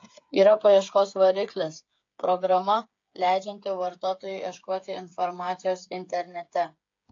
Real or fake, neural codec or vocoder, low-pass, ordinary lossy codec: fake; codec, 16 kHz, 8 kbps, FreqCodec, smaller model; 7.2 kHz; AAC, 48 kbps